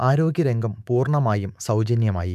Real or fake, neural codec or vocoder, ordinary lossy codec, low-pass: fake; autoencoder, 48 kHz, 128 numbers a frame, DAC-VAE, trained on Japanese speech; none; 14.4 kHz